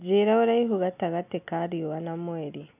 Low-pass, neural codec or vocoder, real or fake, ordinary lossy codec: 3.6 kHz; none; real; AAC, 32 kbps